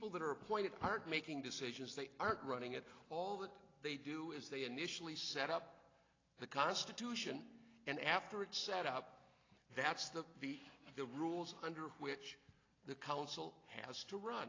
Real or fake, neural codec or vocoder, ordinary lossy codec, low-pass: real; none; AAC, 32 kbps; 7.2 kHz